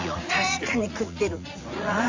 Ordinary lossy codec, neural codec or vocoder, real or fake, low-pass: AAC, 48 kbps; vocoder, 44.1 kHz, 80 mel bands, Vocos; fake; 7.2 kHz